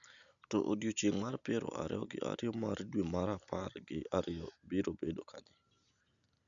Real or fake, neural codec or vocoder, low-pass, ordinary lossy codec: real; none; 7.2 kHz; none